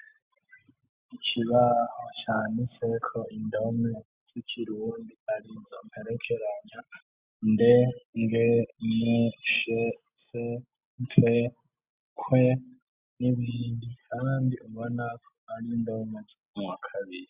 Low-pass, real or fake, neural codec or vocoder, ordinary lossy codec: 3.6 kHz; real; none; Opus, 64 kbps